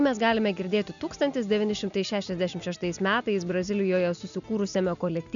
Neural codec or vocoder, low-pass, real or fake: none; 7.2 kHz; real